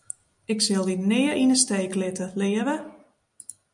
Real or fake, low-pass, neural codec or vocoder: real; 10.8 kHz; none